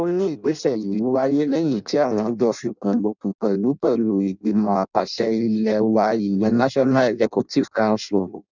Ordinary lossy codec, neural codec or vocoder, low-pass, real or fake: none; codec, 16 kHz in and 24 kHz out, 0.6 kbps, FireRedTTS-2 codec; 7.2 kHz; fake